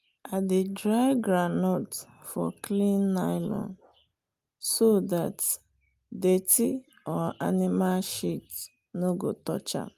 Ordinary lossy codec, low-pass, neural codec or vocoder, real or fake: Opus, 32 kbps; 14.4 kHz; none; real